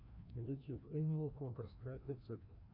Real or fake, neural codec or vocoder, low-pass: fake; codec, 16 kHz, 1 kbps, FreqCodec, larger model; 5.4 kHz